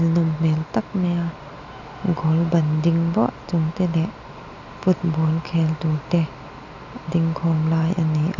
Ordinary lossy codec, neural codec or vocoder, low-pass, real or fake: none; none; 7.2 kHz; real